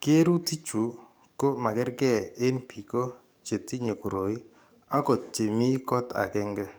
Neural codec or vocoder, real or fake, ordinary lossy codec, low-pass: codec, 44.1 kHz, 7.8 kbps, DAC; fake; none; none